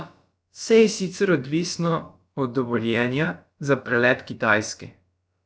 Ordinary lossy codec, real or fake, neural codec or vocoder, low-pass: none; fake; codec, 16 kHz, about 1 kbps, DyCAST, with the encoder's durations; none